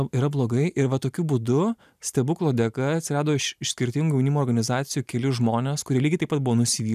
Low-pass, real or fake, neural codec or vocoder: 14.4 kHz; real; none